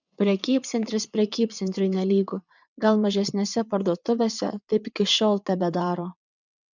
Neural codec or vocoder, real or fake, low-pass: vocoder, 22.05 kHz, 80 mel bands, WaveNeXt; fake; 7.2 kHz